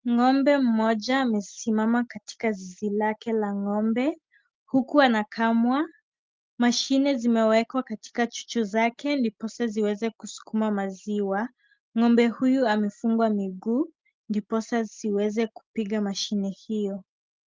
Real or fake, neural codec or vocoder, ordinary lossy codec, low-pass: real; none; Opus, 24 kbps; 7.2 kHz